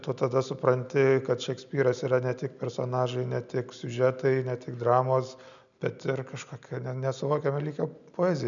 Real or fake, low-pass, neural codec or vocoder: real; 7.2 kHz; none